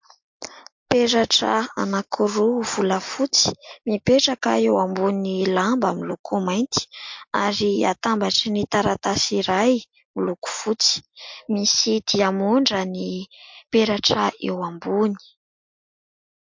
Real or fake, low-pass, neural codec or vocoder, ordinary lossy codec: real; 7.2 kHz; none; MP3, 48 kbps